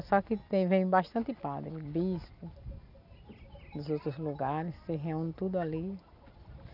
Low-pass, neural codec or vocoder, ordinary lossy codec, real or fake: 5.4 kHz; none; none; real